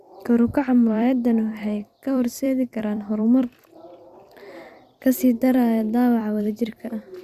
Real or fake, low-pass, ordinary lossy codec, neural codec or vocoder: fake; 14.4 kHz; Opus, 64 kbps; vocoder, 44.1 kHz, 128 mel bands every 256 samples, BigVGAN v2